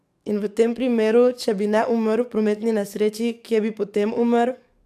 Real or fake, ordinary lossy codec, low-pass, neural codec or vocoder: fake; AAC, 96 kbps; 14.4 kHz; codec, 44.1 kHz, 7.8 kbps, DAC